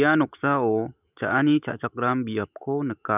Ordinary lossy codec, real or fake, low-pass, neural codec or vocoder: none; fake; 3.6 kHz; autoencoder, 48 kHz, 128 numbers a frame, DAC-VAE, trained on Japanese speech